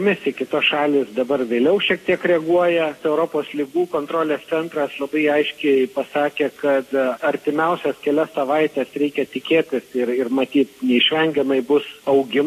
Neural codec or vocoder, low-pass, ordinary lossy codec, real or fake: none; 14.4 kHz; AAC, 48 kbps; real